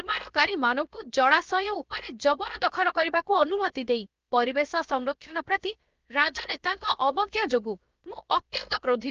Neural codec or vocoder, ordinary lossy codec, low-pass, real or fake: codec, 16 kHz, 0.7 kbps, FocalCodec; Opus, 24 kbps; 7.2 kHz; fake